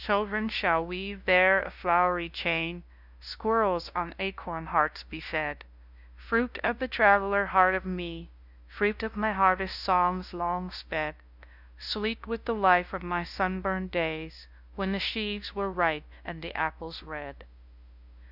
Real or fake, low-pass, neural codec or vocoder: fake; 5.4 kHz; codec, 16 kHz, 0.5 kbps, FunCodec, trained on LibriTTS, 25 frames a second